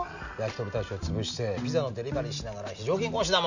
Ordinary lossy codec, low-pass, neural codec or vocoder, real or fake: none; 7.2 kHz; none; real